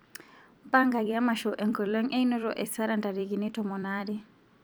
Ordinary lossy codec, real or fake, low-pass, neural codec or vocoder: none; fake; none; vocoder, 44.1 kHz, 128 mel bands every 256 samples, BigVGAN v2